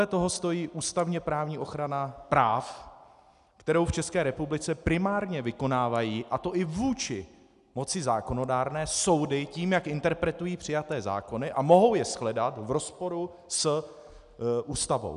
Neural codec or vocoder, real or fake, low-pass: none; real; 10.8 kHz